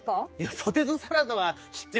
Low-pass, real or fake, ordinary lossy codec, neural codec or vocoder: none; fake; none; codec, 16 kHz, 4 kbps, X-Codec, HuBERT features, trained on general audio